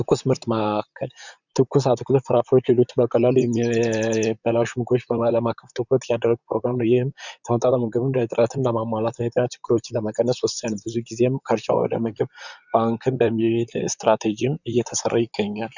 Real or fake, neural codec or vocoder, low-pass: fake; vocoder, 44.1 kHz, 128 mel bands, Pupu-Vocoder; 7.2 kHz